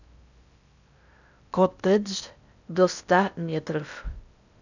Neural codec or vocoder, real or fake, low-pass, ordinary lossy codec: codec, 16 kHz in and 24 kHz out, 0.6 kbps, FocalCodec, streaming, 4096 codes; fake; 7.2 kHz; none